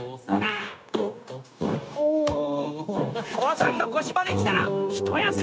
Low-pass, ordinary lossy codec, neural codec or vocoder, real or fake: none; none; codec, 16 kHz, 0.9 kbps, LongCat-Audio-Codec; fake